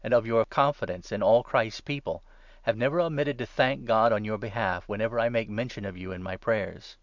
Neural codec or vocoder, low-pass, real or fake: none; 7.2 kHz; real